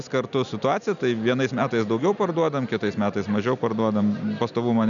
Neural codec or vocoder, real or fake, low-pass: none; real; 7.2 kHz